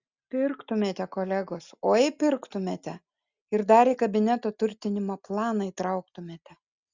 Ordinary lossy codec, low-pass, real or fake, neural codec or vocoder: Opus, 64 kbps; 7.2 kHz; real; none